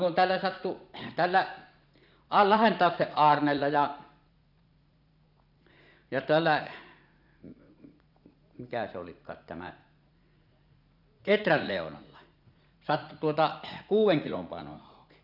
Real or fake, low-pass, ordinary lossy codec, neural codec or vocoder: fake; 5.4 kHz; none; vocoder, 44.1 kHz, 80 mel bands, Vocos